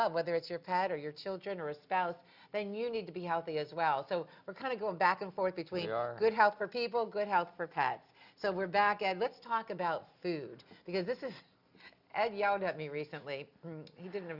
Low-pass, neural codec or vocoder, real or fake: 5.4 kHz; none; real